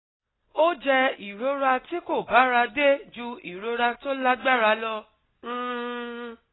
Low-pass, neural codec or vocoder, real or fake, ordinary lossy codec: 7.2 kHz; none; real; AAC, 16 kbps